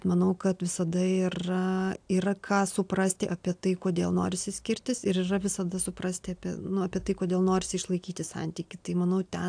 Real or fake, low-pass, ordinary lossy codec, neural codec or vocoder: real; 9.9 kHz; AAC, 64 kbps; none